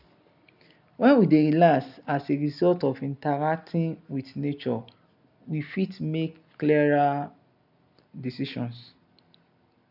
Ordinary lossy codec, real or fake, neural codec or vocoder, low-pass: none; real; none; 5.4 kHz